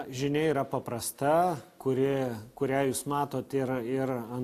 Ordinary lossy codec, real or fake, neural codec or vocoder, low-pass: AAC, 64 kbps; real; none; 14.4 kHz